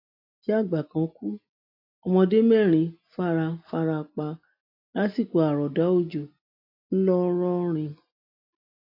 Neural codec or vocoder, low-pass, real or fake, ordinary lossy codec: none; 5.4 kHz; real; AAC, 32 kbps